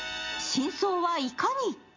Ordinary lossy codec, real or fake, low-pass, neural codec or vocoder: MP3, 64 kbps; real; 7.2 kHz; none